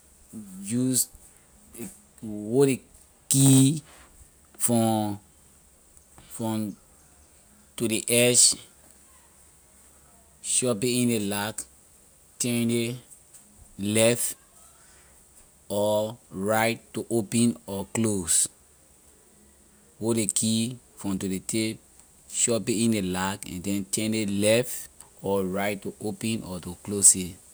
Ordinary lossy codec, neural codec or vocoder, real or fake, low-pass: none; none; real; none